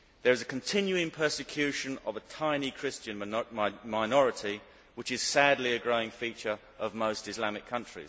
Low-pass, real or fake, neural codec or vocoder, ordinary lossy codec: none; real; none; none